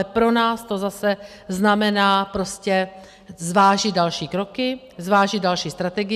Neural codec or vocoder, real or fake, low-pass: none; real; 14.4 kHz